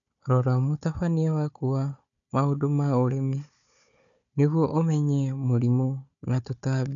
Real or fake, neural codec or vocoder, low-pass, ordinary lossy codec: fake; codec, 16 kHz, 4 kbps, FunCodec, trained on Chinese and English, 50 frames a second; 7.2 kHz; none